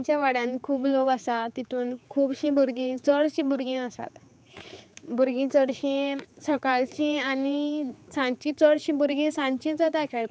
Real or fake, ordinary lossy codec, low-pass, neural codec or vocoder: fake; none; none; codec, 16 kHz, 4 kbps, X-Codec, HuBERT features, trained on general audio